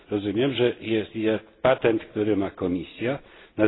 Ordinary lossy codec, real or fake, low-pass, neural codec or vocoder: AAC, 16 kbps; real; 7.2 kHz; none